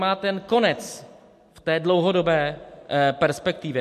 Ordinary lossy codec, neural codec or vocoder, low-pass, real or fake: MP3, 64 kbps; none; 14.4 kHz; real